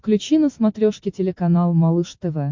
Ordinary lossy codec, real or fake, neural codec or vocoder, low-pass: MP3, 64 kbps; real; none; 7.2 kHz